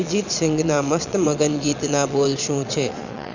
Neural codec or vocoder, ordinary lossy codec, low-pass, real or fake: vocoder, 22.05 kHz, 80 mel bands, Vocos; none; 7.2 kHz; fake